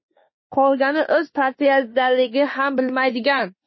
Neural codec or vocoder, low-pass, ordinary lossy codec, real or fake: autoencoder, 48 kHz, 32 numbers a frame, DAC-VAE, trained on Japanese speech; 7.2 kHz; MP3, 24 kbps; fake